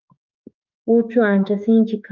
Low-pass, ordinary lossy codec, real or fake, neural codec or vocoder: 7.2 kHz; Opus, 24 kbps; fake; autoencoder, 48 kHz, 32 numbers a frame, DAC-VAE, trained on Japanese speech